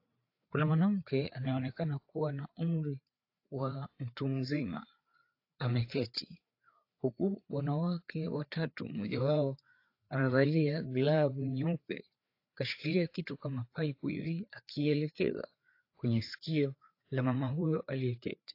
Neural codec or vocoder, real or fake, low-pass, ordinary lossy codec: codec, 16 kHz, 4 kbps, FreqCodec, larger model; fake; 5.4 kHz; AAC, 32 kbps